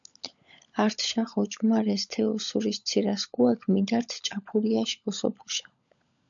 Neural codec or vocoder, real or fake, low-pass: codec, 16 kHz, 16 kbps, FunCodec, trained on LibriTTS, 50 frames a second; fake; 7.2 kHz